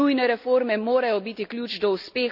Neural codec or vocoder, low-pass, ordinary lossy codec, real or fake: none; 5.4 kHz; none; real